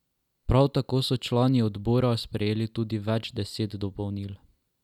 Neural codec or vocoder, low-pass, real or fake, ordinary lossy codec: none; 19.8 kHz; real; none